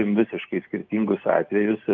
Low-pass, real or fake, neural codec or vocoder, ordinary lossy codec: 7.2 kHz; real; none; Opus, 24 kbps